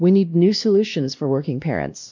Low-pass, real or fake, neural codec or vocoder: 7.2 kHz; fake; codec, 16 kHz, 1 kbps, X-Codec, WavLM features, trained on Multilingual LibriSpeech